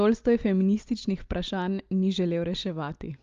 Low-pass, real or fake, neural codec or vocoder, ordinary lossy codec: 7.2 kHz; real; none; Opus, 32 kbps